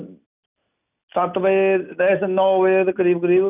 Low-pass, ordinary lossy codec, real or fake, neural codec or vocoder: 3.6 kHz; none; real; none